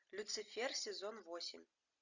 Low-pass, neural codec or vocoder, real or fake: 7.2 kHz; none; real